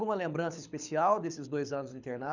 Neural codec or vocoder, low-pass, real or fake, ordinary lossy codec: codec, 24 kHz, 6 kbps, HILCodec; 7.2 kHz; fake; none